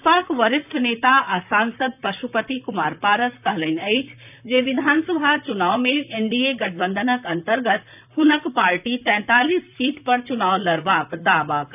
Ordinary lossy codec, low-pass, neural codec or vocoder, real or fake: none; 3.6 kHz; vocoder, 44.1 kHz, 128 mel bands, Pupu-Vocoder; fake